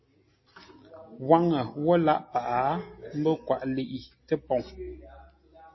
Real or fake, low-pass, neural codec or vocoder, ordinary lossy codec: real; 7.2 kHz; none; MP3, 24 kbps